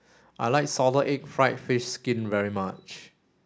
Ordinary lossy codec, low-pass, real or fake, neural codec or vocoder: none; none; real; none